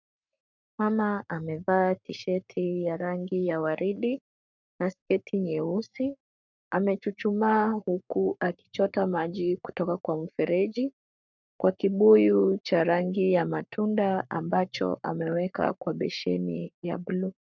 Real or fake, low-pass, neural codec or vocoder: fake; 7.2 kHz; codec, 44.1 kHz, 7.8 kbps, Pupu-Codec